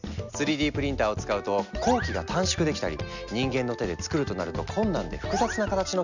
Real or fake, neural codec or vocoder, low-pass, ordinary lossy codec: real; none; 7.2 kHz; none